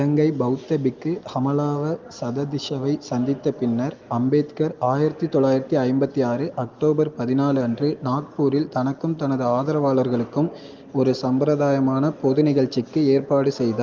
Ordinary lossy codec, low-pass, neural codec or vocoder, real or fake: Opus, 32 kbps; 7.2 kHz; none; real